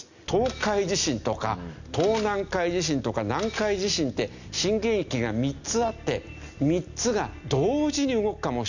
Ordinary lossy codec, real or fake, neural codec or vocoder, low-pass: none; real; none; 7.2 kHz